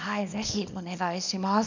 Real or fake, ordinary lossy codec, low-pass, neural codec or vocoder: fake; none; 7.2 kHz; codec, 24 kHz, 0.9 kbps, WavTokenizer, small release